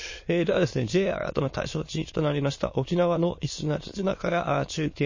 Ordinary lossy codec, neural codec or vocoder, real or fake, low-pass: MP3, 32 kbps; autoencoder, 22.05 kHz, a latent of 192 numbers a frame, VITS, trained on many speakers; fake; 7.2 kHz